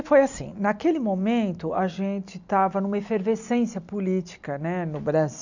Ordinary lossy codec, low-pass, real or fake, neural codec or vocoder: none; 7.2 kHz; real; none